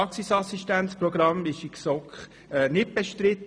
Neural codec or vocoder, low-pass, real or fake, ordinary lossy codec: none; none; real; none